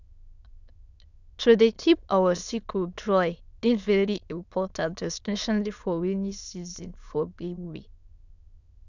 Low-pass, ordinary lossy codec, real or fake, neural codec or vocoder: 7.2 kHz; none; fake; autoencoder, 22.05 kHz, a latent of 192 numbers a frame, VITS, trained on many speakers